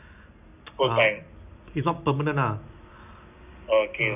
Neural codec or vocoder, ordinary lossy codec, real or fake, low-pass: none; none; real; 3.6 kHz